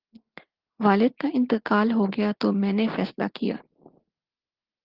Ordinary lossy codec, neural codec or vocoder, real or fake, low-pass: Opus, 16 kbps; none; real; 5.4 kHz